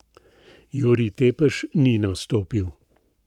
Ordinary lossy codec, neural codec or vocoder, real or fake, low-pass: none; codec, 44.1 kHz, 7.8 kbps, Pupu-Codec; fake; 19.8 kHz